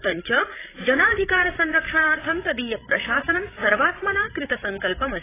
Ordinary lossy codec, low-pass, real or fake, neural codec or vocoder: AAC, 16 kbps; 3.6 kHz; fake; codec, 16 kHz, 8 kbps, FreqCodec, larger model